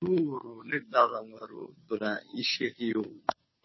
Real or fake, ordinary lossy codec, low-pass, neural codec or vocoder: fake; MP3, 24 kbps; 7.2 kHz; codec, 16 kHz, 2 kbps, FunCodec, trained on Chinese and English, 25 frames a second